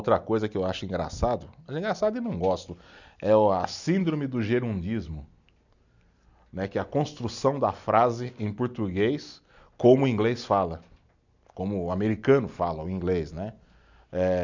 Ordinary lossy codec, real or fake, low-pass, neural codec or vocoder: none; real; 7.2 kHz; none